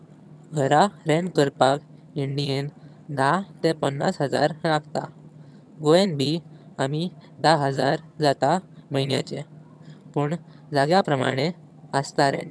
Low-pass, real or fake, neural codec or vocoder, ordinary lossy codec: none; fake; vocoder, 22.05 kHz, 80 mel bands, HiFi-GAN; none